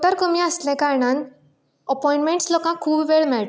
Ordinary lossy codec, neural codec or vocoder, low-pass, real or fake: none; none; none; real